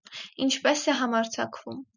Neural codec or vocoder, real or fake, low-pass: none; real; 7.2 kHz